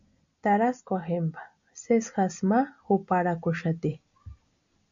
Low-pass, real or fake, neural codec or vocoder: 7.2 kHz; real; none